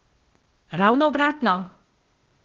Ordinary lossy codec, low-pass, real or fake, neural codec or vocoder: Opus, 16 kbps; 7.2 kHz; fake; codec, 16 kHz, 0.8 kbps, ZipCodec